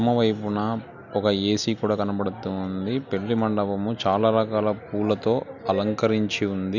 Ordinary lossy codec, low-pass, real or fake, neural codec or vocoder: none; 7.2 kHz; real; none